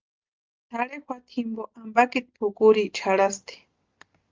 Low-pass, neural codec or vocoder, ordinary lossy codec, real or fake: 7.2 kHz; none; Opus, 32 kbps; real